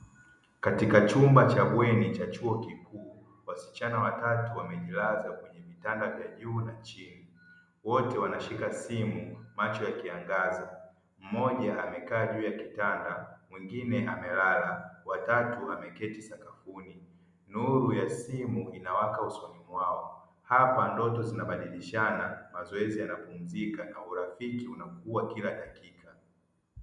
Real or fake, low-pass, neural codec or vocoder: real; 10.8 kHz; none